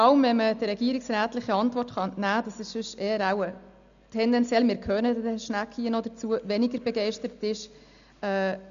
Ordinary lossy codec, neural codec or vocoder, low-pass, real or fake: none; none; 7.2 kHz; real